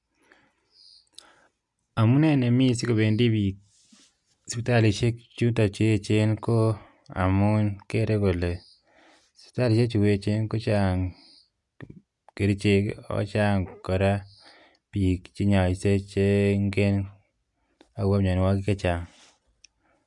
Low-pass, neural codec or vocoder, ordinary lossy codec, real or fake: 10.8 kHz; none; none; real